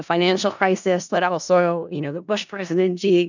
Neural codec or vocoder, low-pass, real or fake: codec, 16 kHz in and 24 kHz out, 0.4 kbps, LongCat-Audio-Codec, four codebook decoder; 7.2 kHz; fake